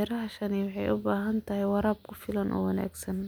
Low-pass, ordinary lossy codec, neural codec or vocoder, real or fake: none; none; none; real